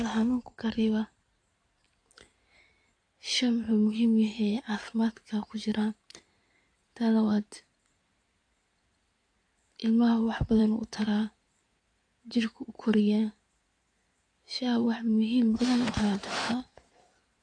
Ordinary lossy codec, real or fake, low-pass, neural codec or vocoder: AAC, 48 kbps; fake; 9.9 kHz; codec, 16 kHz in and 24 kHz out, 2.2 kbps, FireRedTTS-2 codec